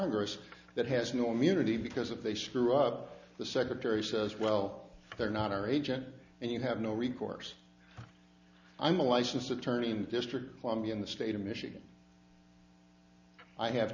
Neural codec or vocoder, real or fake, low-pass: none; real; 7.2 kHz